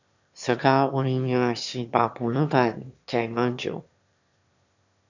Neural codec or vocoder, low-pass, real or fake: autoencoder, 22.05 kHz, a latent of 192 numbers a frame, VITS, trained on one speaker; 7.2 kHz; fake